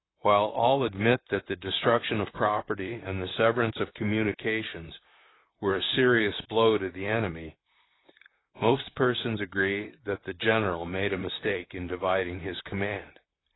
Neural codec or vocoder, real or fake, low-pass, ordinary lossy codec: vocoder, 44.1 kHz, 128 mel bands, Pupu-Vocoder; fake; 7.2 kHz; AAC, 16 kbps